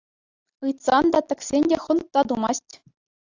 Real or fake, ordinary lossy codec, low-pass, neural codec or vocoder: real; AAC, 48 kbps; 7.2 kHz; none